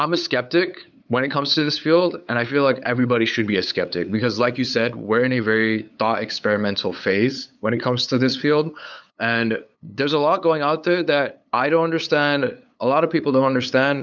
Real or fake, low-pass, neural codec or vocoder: fake; 7.2 kHz; codec, 16 kHz, 8 kbps, FunCodec, trained on LibriTTS, 25 frames a second